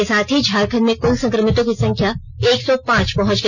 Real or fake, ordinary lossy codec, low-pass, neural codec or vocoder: real; none; 7.2 kHz; none